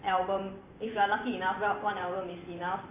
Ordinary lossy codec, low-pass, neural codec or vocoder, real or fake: AAC, 32 kbps; 3.6 kHz; vocoder, 44.1 kHz, 128 mel bands every 512 samples, BigVGAN v2; fake